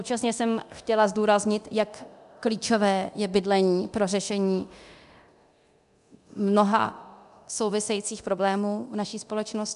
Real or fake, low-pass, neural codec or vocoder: fake; 10.8 kHz; codec, 24 kHz, 0.9 kbps, DualCodec